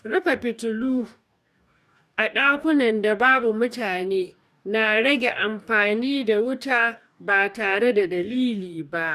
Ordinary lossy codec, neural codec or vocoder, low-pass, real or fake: none; codec, 44.1 kHz, 2.6 kbps, DAC; 14.4 kHz; fake